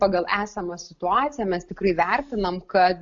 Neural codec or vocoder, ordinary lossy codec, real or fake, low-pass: none; AAC, 64 kbps; real; 7.2 kHz